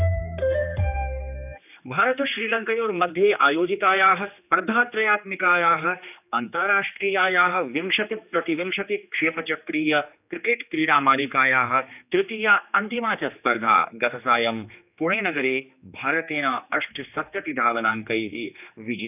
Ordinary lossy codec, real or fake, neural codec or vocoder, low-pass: none; fake; codec, 16 kHz, 2 kbps, X-Codec, HuBERT features, trained on general audio; 3.6 kHz